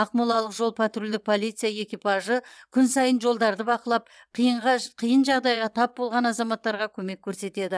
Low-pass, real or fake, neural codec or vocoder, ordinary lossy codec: none; fake; vocoder, 22.05 kHz, 80 mel bands, WaveNeXt; none